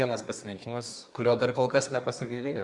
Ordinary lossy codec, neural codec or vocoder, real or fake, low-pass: Opus, 64 kbps; codec, 24 kHz, 1 kbps, SNAC; fake; 10.8 kHz